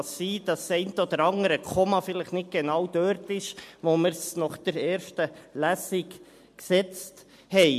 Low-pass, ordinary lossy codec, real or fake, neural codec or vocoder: 14.4 kHz; MP3, 64 kbps; real; none